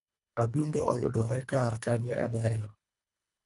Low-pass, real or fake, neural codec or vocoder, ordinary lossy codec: 10.8 kHz; fake; codec, 24 kHz, 1.5 kbps, HILCodec; MP3, 96 kbps